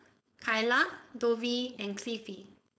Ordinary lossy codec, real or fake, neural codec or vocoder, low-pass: none; fake; codec, 16 kHz, 4.8 kbps, FACodec; none